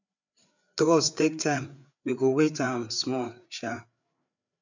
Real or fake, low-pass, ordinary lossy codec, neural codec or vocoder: fake; 7.2 kHz; none; codec, 16 kHz, 4 kbps, FreqCodec, larger model